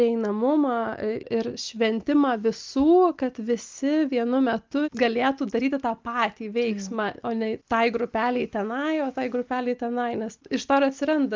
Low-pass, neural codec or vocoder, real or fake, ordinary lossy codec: 7.2 kHz; none; real; Opus, 32 kbps